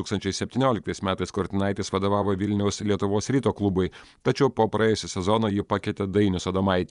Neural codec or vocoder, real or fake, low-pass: vocoder, 24 kHz, 100 mel bands, Vocos; fake; 10.8 kHz